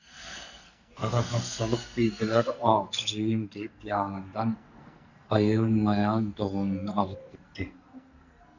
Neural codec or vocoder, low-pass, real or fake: codec, 44.1 kHz, 2.6 kbps, SNAC; 7.2 kHz; fake